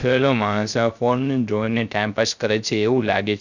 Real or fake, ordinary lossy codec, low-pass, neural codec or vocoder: fake; none; 7.2 kHz; codec, 16 kHz, 0.3 kbps, FocalCodec